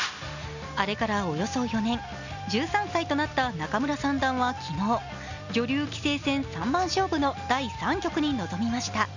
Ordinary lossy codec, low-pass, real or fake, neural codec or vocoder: none; 7.2 kHz; real; none